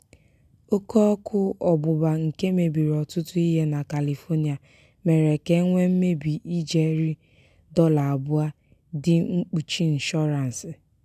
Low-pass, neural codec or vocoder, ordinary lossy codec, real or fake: 14.4 kHz; none; none; real